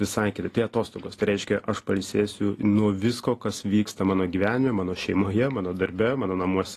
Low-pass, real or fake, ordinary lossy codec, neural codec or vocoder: 14.4 kHz; real; AAC, 48 kbps; none